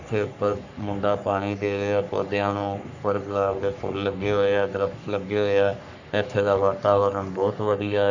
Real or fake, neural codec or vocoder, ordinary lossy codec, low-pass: fake; codec, 44.1 kHz, 3.4 kbps, Pupu-Codec; none; 7.2 kHz